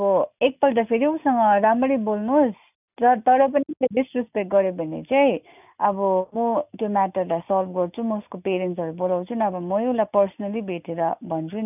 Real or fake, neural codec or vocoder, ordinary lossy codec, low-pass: real; none; none; 3.6 kHz